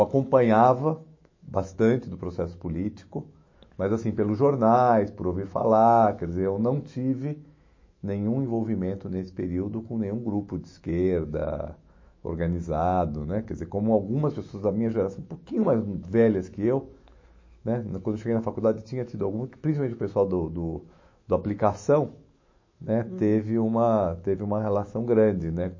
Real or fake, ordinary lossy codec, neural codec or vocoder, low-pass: fake; MP3, 32 kbps; autoencoder, 48 kHz, 128 numbers a frame, DAC-VAE, trained on Japanese speech; 7.2 kHz